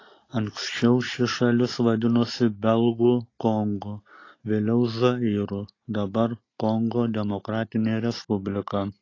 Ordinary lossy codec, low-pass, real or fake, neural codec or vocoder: AAC, 32 kbps; 7.2 kHz; real; none